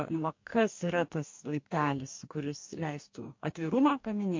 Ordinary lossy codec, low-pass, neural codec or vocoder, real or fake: MP3, 48 kbps; 7.2 kHz; codec, 44.1 kHz, 2.6 kbps, DAC; fake